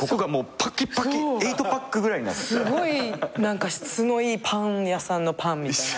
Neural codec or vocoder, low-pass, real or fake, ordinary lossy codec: none; none; real; none